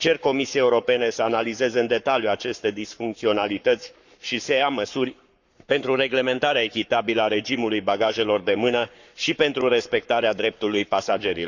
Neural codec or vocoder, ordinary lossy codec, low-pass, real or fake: codec, 44.1 kHz, 7.8 kbps, Pupu-Codec; none; 7.2 kHz; fake